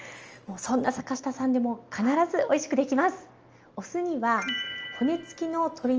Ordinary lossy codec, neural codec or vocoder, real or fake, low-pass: Opus, 24 kbps; none; real; 7.2 kHz